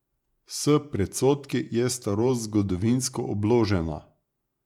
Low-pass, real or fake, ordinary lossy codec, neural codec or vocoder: 19.8 kHz; fake; none; vocoder, 48 kHz, 128 mel bands, Vocos